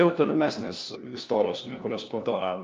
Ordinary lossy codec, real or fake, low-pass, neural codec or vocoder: Opus, 24 kbps; fake; 7.2 kHz; codec, 16 kHz, 1 kbps, FunCodec, trained on LibriTTS, 50 frames a second